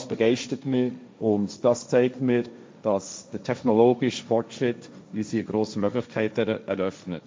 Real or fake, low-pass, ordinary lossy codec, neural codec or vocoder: fake; none; none; codec, 16 kHz, 1.1 kbps, Voila-Tokenizer